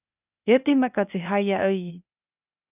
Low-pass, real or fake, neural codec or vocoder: 3.6 kHz; fake; codec, 16 kHz, 0.8 kbps, ZipCodec